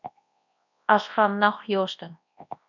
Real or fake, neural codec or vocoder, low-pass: fake; codec, 24 kHz, 0.9 kbps, WavTokenizer, large speech release; 7.2 kHz